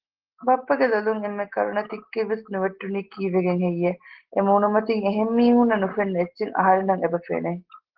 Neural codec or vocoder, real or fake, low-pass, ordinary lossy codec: none; real; 5.4 kHz; Opus, 16 kbps